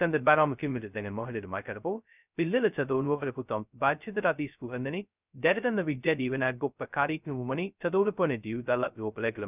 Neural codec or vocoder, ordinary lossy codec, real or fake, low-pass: codec, 16 kHz, 0.2 kbps, FocalCodec; none; fake; 3.6 kHz